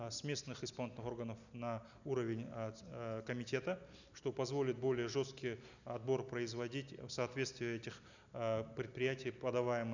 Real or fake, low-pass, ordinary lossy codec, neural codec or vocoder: real; 7.2 kHz; none; none